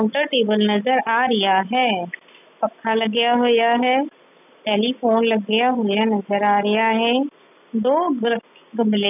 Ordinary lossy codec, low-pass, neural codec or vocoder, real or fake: none; 3.6 kHz; none; real